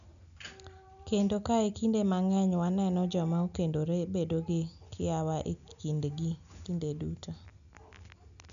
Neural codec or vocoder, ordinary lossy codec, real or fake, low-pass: none; none; real; 7.2 kHz